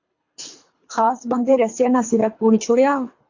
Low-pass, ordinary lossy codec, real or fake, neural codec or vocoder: 7.2 kHz; AAC, 48 kbps; fake; codec, 24 kHz, 3 kbps, HILCodec